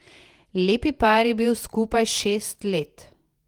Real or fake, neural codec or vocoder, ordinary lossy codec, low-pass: fake; vocoder, 48 kHz, 128 mel bands, Vocos; Opus, 24 kbps; 19.8 kHz